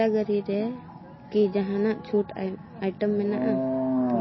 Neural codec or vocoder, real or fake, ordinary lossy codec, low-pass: none; real; MP3, 24 kbps; 7.2 kHz